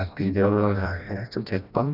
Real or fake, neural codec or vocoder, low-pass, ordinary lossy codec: fake; codec, 16 kHz, 1 kbps, FreqCodec, smaller model; 5.4 kHz; none